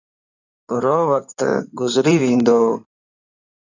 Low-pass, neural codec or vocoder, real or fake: 7.2 kHz; codec, 16 kHz in and 24 kHz out, 2.2 kbps, FireRedTTS-2 codec; fake